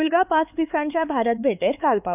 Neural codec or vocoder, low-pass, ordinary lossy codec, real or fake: codec, 16 kHz, 4 kbps, X-Codec, HuBERT features, trained on LibriSpeech; 3.6 kHz; none; fake